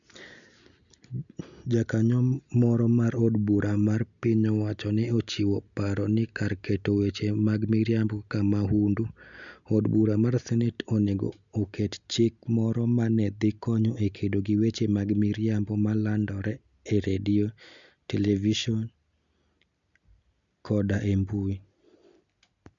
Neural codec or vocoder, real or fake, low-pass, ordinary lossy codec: none; real; 7.2 kHz; none